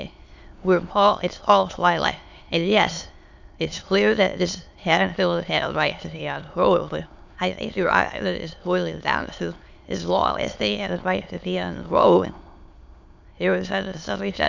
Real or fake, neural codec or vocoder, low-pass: fake; autoencoder, 22.05 kHz, a latent of 192 numbers a frame, VITS, trained on many speakers; 7.2 kHz